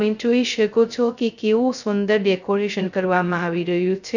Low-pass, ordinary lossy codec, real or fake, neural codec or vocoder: 7.2 kHz; none; fake; codec, 16 kHz, 0.2 kbps, FocalCodec